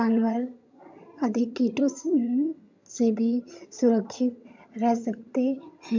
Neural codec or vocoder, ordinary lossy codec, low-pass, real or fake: vocoder, 22.05 kHz, 80 mel bands, HiFi-GAN; MP3, 64 kbps; 7.2 kHz; fake